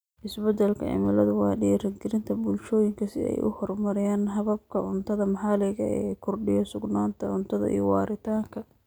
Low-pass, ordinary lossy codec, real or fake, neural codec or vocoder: none; none; real; none